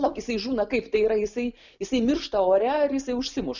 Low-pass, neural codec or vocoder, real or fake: 7.2 kHz; none; real